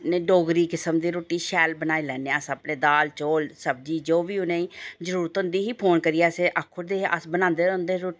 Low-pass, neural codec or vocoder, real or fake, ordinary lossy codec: none; none; real; none